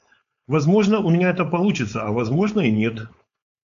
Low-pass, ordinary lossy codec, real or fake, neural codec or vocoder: 7.2 kHz; MP3, 64 kbps; fake; codec, 16 kHz, 4.8 kbps, FACodec